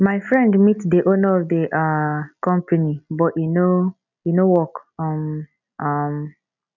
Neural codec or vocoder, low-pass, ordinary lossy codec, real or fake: none; 7.2 kHz; none; real